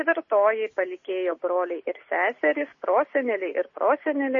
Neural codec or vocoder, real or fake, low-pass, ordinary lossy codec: none; real; 7.2 kHz; MP3, 32 kbps